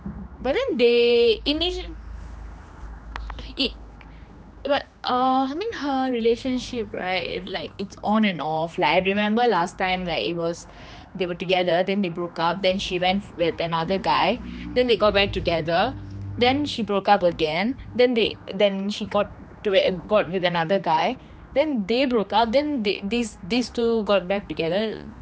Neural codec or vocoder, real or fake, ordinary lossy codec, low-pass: codec, 16 kHz, 2 kbps, X-Codec, HuBERT features, trained on general audio; fake; none; none